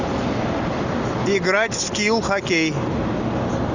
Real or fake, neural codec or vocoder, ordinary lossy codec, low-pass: real; none; Opus, 64 kbps; 7.2 kHz